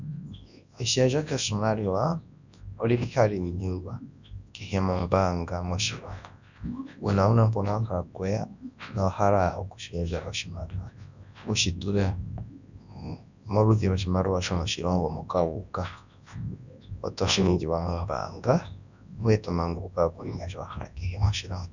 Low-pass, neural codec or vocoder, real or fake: 7.2 kHz; codec, 24 kHz, 0.9 kbps, WavTokenizer, large speech release; fake